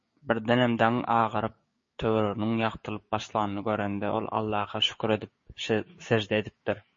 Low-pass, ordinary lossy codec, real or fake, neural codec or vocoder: 7.2 kHz; MP3, 32 kbps; fake; codec, 16 kHz, 6 kbps, DAC